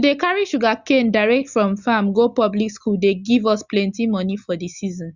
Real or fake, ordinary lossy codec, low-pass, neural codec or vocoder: real; Opus, 64 kbps; 7.2 kHz; none